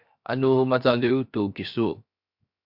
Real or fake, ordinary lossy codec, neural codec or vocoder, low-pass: fake; MP3, 48 kbps; codec, 16 kHz, 0.7 kbps, FocalCodec; 5.4 kHz